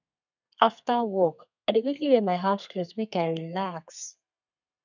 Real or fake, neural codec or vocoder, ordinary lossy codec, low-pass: fake; codec, 32 kHz, 1.9 kbps, SNAC; none; 7.2 kHz